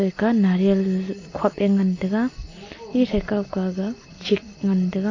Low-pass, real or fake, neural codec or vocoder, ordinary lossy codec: 7.2 kHz; real; none; AAC, 32 kbps